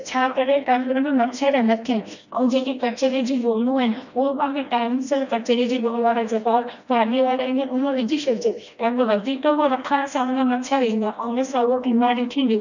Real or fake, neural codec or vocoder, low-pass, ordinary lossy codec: fake; codec, 16 kHz, 1 kbps, FreqCodec, smaller model; 7.2 kHz; none